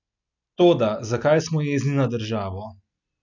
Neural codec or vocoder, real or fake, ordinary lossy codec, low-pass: none; real; none; 7.2 kHz